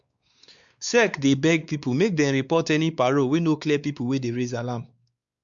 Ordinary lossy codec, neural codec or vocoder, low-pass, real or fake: Opus, 64 kbps; codec, 16 kHz, 4 kbps, X-Codec, WavLM features, trained on Multilingual LibriSpeech; 7.2 kHz; fake